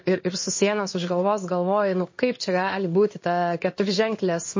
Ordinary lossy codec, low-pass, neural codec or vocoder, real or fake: MP3, 32 kbps; 7.2 kHz; codec, 16 kHz in and 24 kHz out, 1 kbps, XY-Tokenizer; fake